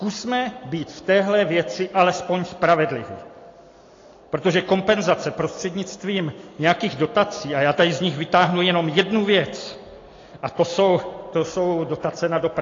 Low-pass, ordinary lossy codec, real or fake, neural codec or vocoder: 7.2 kHz; AAC, 32 kbps; real; none